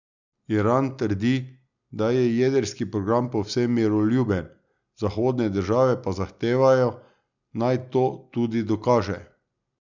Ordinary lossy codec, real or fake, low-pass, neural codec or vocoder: none; real; 7.2 kHz; none